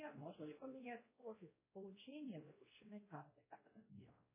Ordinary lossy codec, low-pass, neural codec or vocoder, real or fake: MP3, 16 kbps; 3.6 kHz; codec, 16 kHz, 1 kbps, X-Codec, WavLM features, trained on Multilingual LibriSpeech; fake